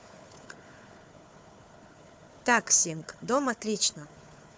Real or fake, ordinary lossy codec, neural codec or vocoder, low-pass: fake; none; codec, 16 kHz, 4 kbps, FunCodec, trained on Chinese and English, 50 frames a second; none